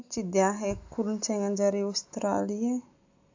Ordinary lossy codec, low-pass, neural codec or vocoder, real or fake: AAC, 48 kbps; 7.2 kHz; none; real